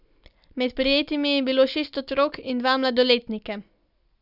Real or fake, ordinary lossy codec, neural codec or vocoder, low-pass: real; none; none; 5.4 kHz